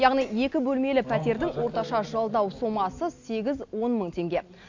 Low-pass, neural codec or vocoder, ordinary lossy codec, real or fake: 7.2 kHz; none; AAC, 48 kbps; real